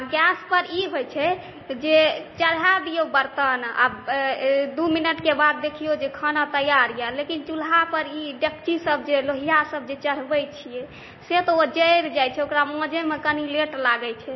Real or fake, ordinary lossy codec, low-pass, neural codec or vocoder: real; MP3, 24 kbps; 7.2 kHz; none